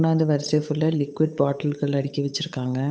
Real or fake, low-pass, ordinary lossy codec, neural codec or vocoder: fake; none; none; codec, 16 kHz, 8 kbps, FunCodec, trained on Chinese and English, 25 frames a second